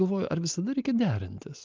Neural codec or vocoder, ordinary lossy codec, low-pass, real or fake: none; Opus, 32 kbps; 7.2 kHz; real